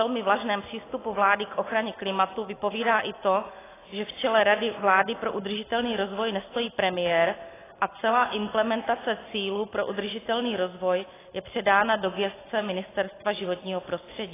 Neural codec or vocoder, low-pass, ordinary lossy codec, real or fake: none; 3.6 kHz; AAC, 16 kbps; real